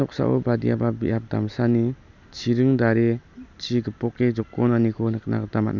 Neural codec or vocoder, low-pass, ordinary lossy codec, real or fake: none; 7.2 kHz; none; real